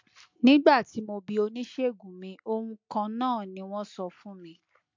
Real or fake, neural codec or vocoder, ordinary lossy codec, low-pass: real; none; MP3, 48 kbps; 7.2 kHz